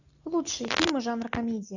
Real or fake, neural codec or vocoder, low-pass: real; none; 7.2 kHz